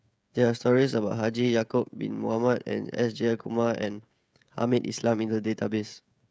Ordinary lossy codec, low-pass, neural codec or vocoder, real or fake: none; none; codec, 16 kHz, 16 kbps, FreqCodec, smaller model; fake